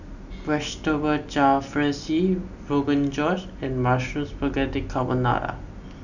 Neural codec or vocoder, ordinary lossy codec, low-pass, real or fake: none; none; 7.2 kHz; real